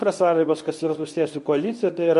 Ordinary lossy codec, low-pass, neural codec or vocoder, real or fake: MP3, 48 kbps; 10.8 kHz; codec, 24 kHz, 0.9 kbps, WavTokenizer, medium speech release version 1; fake